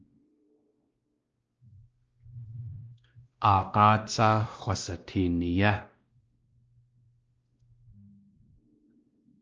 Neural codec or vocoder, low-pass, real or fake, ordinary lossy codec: codec, 16 kHz, 1 kbps, X-Codec, WavLM features, trained on Multilingual LibriSpeech; 7.2 kHz; fake; Opus, 24 kbps